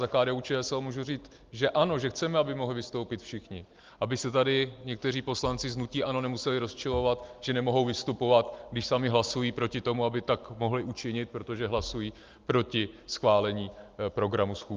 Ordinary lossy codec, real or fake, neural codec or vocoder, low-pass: Opus, 32 kbps; real; none; 7.2 kHz